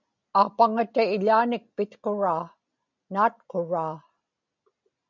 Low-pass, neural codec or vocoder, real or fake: 7.2 kHz; none; real